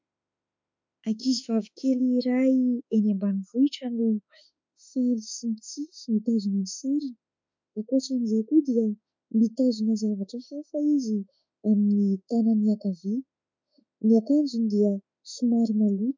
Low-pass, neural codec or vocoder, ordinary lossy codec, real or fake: 7.2 kHz; autoencoder, 48 kHz, 32 numbers a frame, DAC-VAE, trained on Japanese speech; MP3, 64 kbps; fake